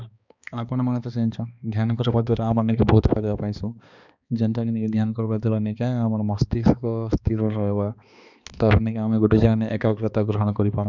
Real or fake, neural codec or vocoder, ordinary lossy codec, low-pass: fake; codec, 16 kHz, 2 kbps, X-Codec, HuBERT features, trained on balanced general audio; none; 7.2 kHz